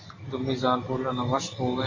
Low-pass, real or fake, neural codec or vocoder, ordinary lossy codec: 7.2 kHz; real; none; AAC, 32 kbps